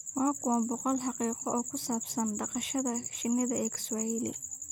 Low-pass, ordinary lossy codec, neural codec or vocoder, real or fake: none; none; vocoder, 44.1 kHz, 128 mel bands every 256 samples, BigVGAN v2; fake